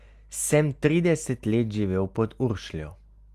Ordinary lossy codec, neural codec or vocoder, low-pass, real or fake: Opus, 32 kbps; none; 14.4 kHz; real